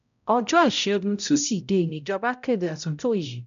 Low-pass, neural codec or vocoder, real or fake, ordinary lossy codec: 7.2 kHz; codec, 16 kHz, 0.5 kbps, X-Codec, HuBERT features, trained on balanced general audio; fake; none